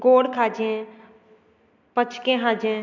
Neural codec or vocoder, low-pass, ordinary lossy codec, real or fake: none; 7.2 kHz; none; real